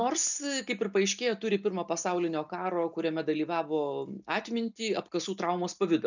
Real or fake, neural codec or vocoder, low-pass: real; none; 7.2 kHz